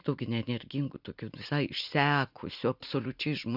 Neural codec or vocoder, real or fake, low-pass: none; real; 5.4 kHz